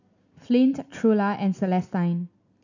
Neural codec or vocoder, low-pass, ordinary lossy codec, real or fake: none; 7.2 kHz; none; real